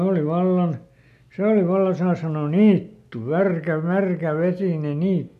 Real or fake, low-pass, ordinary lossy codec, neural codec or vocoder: real; 14.4 kHz; none; none